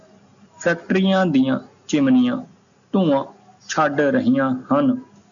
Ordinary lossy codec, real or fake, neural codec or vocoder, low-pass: MP3, 96 kbps; real; none; 7.2 kHz